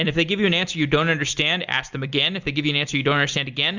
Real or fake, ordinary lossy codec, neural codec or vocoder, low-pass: real; Opus, 64 kbps; none; 7.2 kHz